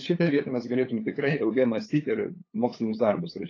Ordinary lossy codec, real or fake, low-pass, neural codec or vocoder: AAC, 32 kbps; fake; 7.2 kHz; codec, 16 kHz, 8 kbps, FunCodec, trained on LibriTTS, 25 frames a second